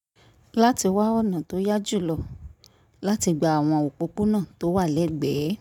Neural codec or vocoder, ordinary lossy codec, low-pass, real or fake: none; none; 19.8 kHz; real